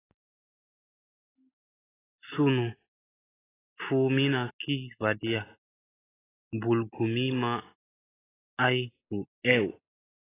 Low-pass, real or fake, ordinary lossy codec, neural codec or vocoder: 3.6 kHz; real; AAC, 16 kbps; none